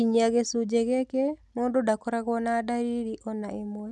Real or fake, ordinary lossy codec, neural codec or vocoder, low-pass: real; none; none; none